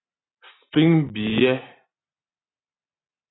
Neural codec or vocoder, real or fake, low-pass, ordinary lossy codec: none; real; 7.2 kHz; AAC, 16 kbps